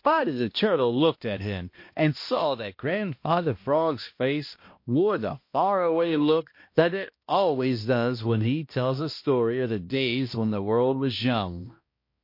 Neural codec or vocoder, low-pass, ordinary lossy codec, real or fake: codec, 16 kHz, 1 kbps, X-Codec, HuBERT features, trained on balanced general audio; 5.4 kHz; MP3, 32 kbps; fake